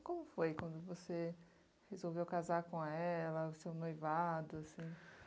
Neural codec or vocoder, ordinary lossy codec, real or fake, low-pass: none; none; real; none